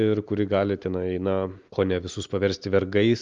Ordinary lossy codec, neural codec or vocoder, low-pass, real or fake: Opus, 32 kbps; none; 7.2 kHz; real